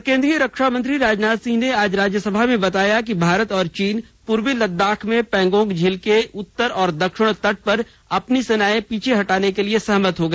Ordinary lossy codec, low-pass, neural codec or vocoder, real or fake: none; none; none; real